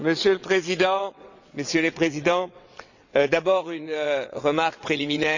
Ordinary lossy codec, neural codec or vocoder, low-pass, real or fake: none; vocoder, 22.05 kHz, 80 mel bands, WaveNeXt; 7.2 kHz; fake